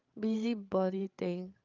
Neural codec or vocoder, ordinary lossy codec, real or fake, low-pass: codec, 16 kHz, 16 kbps, FreqCodec, larger model; Opus, 24 kbps; fake; 7.2 kHz